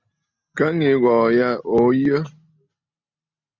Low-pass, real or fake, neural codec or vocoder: 7.2 kHz; real; none